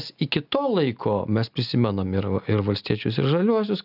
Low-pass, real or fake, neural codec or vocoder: 5.4 kHz; real; none